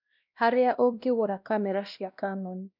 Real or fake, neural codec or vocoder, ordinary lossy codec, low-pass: fake; codec, 16 kHz, 1 kbps, X-Codec, WavLM features, trained on Multilingual LibriSpeech; none; 5.4 kHz